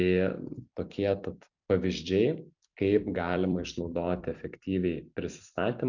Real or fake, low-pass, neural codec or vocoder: fake; 7.2 kHz; vocoder, 44.1 kHz, 128 mel bands every 256 samples, BigVGAN v2